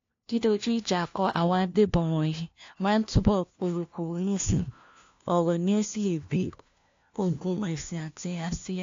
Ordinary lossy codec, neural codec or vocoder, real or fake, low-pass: AAC, 48 kbps; codec, 16 kHz, 1 kbps, FunCodec, trained on LibriTTS, 50 frames a second; fake; 7.2 kHz